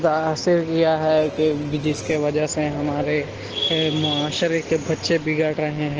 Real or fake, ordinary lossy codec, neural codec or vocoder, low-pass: real; Opus, 16 kbps; none; 7.2 kHz